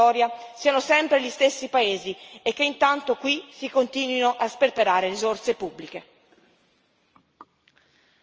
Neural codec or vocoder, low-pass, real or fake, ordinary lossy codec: none; 7.2 kHz; real; Opus, 24 kbps